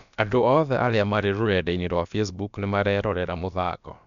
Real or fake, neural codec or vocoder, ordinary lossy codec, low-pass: fake; codec, 16 kHz, about 1 kbps, DyCAST, with the encoder's durations; none; 7.2 kHz